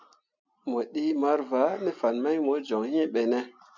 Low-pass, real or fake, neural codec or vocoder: 7.2 kHz; real; none